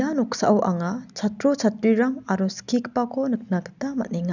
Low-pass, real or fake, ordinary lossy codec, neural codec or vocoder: 7.2 kHz; real; none; none